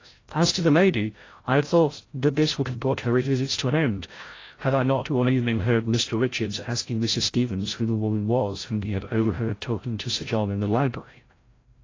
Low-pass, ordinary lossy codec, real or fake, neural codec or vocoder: 7.2 kHz; AAC, 32 kbps; fake; codec, 16 kHz, 0.5 kbps, FreqCodec, larger model